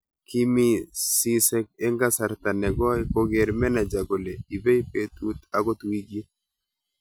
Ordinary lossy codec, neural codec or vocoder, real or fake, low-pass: none; none; real; none